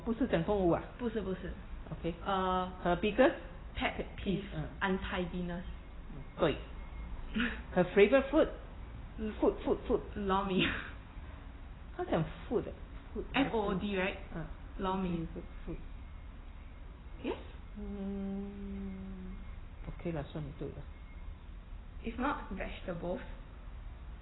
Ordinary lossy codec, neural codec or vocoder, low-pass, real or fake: AAC, 16 kbps; none; 7.2 kHz; real